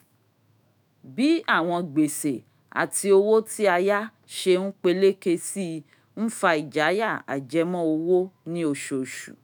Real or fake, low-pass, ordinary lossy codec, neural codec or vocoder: fake; none; none; autoencoder, 48 kHz, 128 numbers a frame, DAC-VAE, trained on Japanese speech